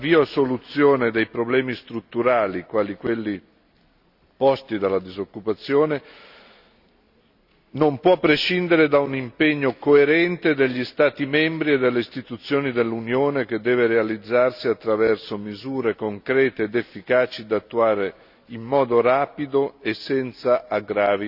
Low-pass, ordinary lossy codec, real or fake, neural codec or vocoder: 5.4 kHz; none; real; none